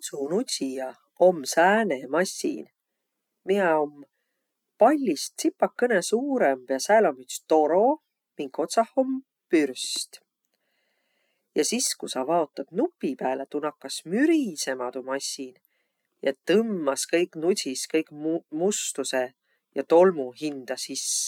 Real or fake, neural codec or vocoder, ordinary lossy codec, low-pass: real; none; none; 19.8 kHz